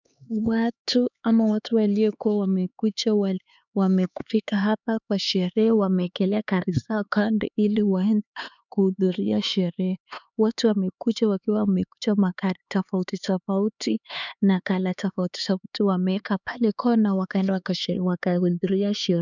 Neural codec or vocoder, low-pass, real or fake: codec, 16 kHz, 4 kbps, X-Codec, HuBERT features, trained on LibriSpeech; 7.2 kHz; fake